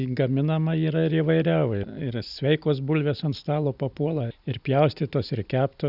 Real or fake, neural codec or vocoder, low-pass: real; none; 5.4 kHz